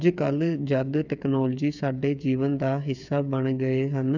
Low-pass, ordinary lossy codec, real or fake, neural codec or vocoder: 7.2 kHz; none; fake; codec, 16 kHz, 8 kbps, FreqCodec, smaller model